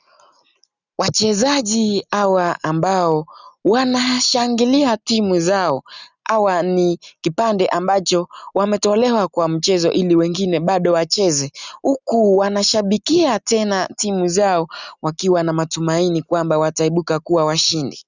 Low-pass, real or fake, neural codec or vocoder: 7.2 kHz; real; none